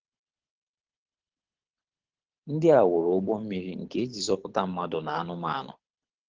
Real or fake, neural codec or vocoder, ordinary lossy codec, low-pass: fake; codec, 24 kHz, 6 kbps, HILCodec; Opus, 16 kbps; 7.2 kHz